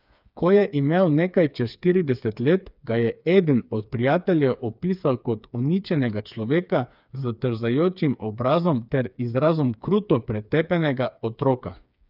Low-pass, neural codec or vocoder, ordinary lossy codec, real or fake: 5.4 kHz; codec, 16 kHz, 4 kbps, FreqCodec, smaller model; none; fake